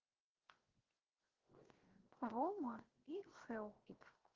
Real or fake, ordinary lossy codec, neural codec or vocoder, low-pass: fake; Opus, 16 kbps; codec, 16 kHz, 0.7 kbps, FocalCodec; 7.2 kHz